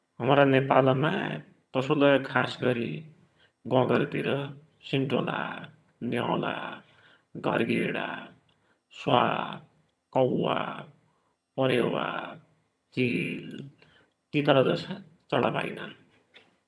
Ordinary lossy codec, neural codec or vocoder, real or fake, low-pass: none; vocoder, 22.05 kHz, 80 mel bands, HiFi-GAN; fake; none